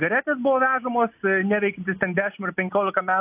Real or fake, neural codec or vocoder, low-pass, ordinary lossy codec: real; none; 3.6 kHz; AAC, 32 kbps